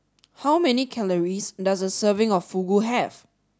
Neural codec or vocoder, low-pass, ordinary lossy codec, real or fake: none; none; none; real